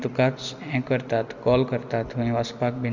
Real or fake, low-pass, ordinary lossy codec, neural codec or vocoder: real; 7.2 kHz; Opus, 64 kbps; none